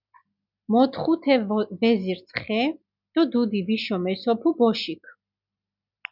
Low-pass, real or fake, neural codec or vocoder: 5.4 kHz; real; none